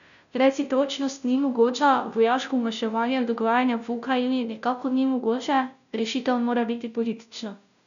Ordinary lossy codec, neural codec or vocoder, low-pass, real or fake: none; codec, 16 kHz, 0.5 kbps, FunCodec, trained on Chinese and English, 25 frames a second; 7.2 kHz; fake